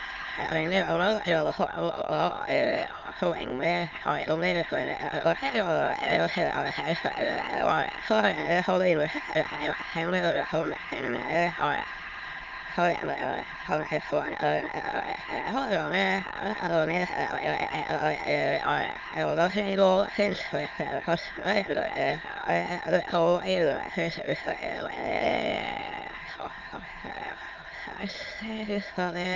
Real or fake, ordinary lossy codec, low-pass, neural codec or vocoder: fake; Opus, 24 kbps; 7.2 kHz; autoencoder, 22.05 kHz, a latent of 192 numbers a frame, VITS, trained on many speakers